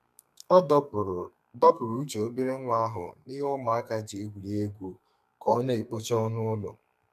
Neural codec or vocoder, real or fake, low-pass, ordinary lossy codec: codec, 32 kHz, 1.9 kbps, SNAC; fake; 14.4 kHz; MP3, 96 kbps